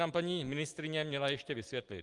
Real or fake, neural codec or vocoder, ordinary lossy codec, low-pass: real; none; Opus, 24 kbps; 10.8 kHz